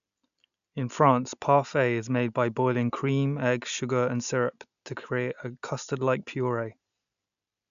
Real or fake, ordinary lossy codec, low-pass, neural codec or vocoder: real; none; 7.2 kHz; none